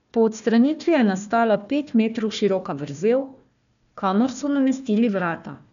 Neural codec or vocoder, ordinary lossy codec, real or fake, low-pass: codec, 16 kHz, 1 kbps, FunCodec, trained on Chinese and English, 50 frames a second; none; fake; 7.2 kHz